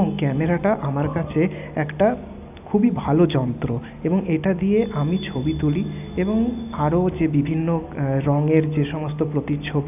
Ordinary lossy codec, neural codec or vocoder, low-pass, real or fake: none; none; 3.6 kHz; real